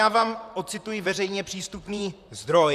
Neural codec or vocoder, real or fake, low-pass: vocoder, 44.1 kHz, 128 mel bands, Pupu-Vocoder; fake; 14.4 kHz